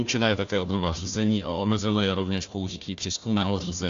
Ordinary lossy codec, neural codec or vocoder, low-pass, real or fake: AAC, 64 kbps; codec, 16 kHz, 1 kbps, FunCodec, trained on Chinese and English, 50 frames a second; 7.2 kHz; fake